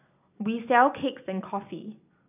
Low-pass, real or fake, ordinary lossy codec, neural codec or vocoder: 3.6 kHz; real; none; none